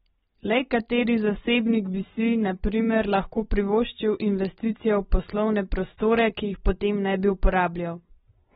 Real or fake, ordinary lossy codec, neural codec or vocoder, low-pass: real; AAC, 16 kbps; none; 9.9 kHz